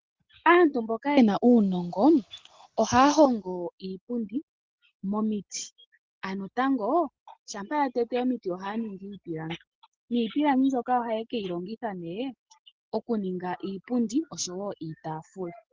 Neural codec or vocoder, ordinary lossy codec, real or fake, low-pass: none; Opus, 16 kbps; real; 7.2 kHz